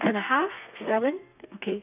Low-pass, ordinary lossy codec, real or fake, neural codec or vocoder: 3.6 kHz; none; fake; codec, 32 kHz, 1.9 kbps, SNAC